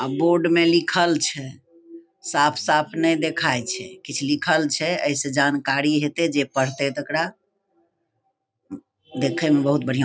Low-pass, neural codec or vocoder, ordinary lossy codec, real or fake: none; none; none; real